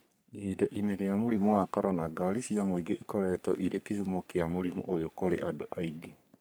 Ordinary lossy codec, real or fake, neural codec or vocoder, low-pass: none; fake; codec, 44.1 kHz, 3.4 kbps, Pupu-Codec; none